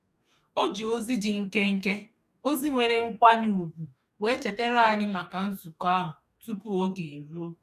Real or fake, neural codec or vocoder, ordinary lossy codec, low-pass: fake; codec, 44.1 kHz, 2.6 kbps, DAC; none; 14.4 kHz